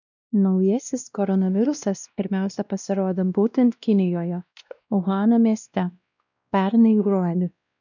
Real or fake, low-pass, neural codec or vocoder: fake; 7.2 kHz; codec, 16 kHz, 1 kbps, X-Codec, WavLM features, trained on Multilingual LibriSpeech